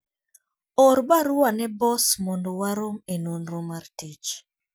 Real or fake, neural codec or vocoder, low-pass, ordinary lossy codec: real; none; none; none